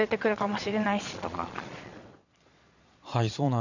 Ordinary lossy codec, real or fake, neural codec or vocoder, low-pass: none; fake; vocoder, 22.05 kHz, 80 mel bands, Vocos; 7.2 kHz